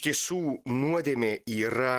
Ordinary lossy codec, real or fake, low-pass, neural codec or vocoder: Opus, 16 kbps; real; 14.4 kHz; none